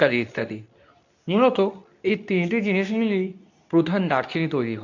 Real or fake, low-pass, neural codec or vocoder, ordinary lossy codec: fake; 7.2 kHz; codec, 24 kHz, 0.9 kbps, WavTokenizer, medium speech release version 2; none